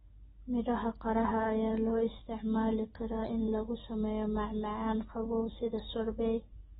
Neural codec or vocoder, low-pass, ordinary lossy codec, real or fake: none; 9.9 kHz; AAC, 16 kbps; real